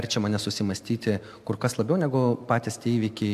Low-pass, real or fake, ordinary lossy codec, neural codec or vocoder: 14.4 kHz; real; AAC, 96 kbps; none